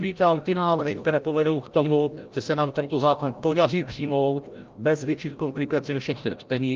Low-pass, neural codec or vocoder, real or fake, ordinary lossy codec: 7.2 kHz; codec, 16 kHz, 0.5 kbps, FreqCodec, larger model; fake; Opus, 32 kbps